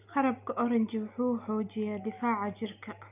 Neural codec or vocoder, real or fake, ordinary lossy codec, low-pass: none; real; none; 3.6 kHz